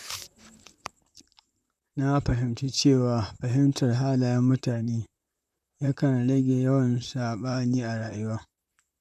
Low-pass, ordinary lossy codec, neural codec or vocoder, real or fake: 14.4 kHz; none; vocoder, 44.1 kHz, 128 mel bands, Pupu-Vocoder; fake